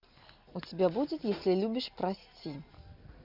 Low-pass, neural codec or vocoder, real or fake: 5.4 kHz; none; real